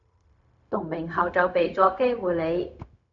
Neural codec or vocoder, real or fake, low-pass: codec, 16 kHz, 0.4 kbps, LongCat-Audio-Codec; fake; 7.2 kHz